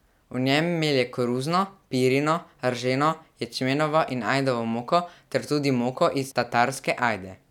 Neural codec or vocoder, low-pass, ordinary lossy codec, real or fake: none; 19.8 kHz; none; real